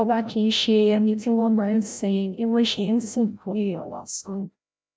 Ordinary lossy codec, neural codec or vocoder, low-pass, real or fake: none; codec, 16 kHz, 0.5 kbps, FreqCodec, larger model; none; fake